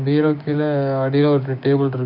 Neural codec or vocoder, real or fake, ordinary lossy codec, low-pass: codec, 16 kHz, 6 kbps, DAC; fake; none; 5.4 kHz